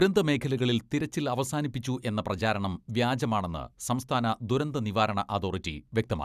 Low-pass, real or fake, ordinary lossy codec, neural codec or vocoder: 14.4 kHz; real; none; none